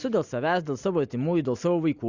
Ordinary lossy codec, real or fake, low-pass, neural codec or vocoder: Opus, 64 kbps; real; 7.2 kHz; none